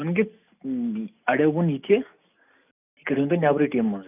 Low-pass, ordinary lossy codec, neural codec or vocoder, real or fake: 3.6 kHz; none; none; real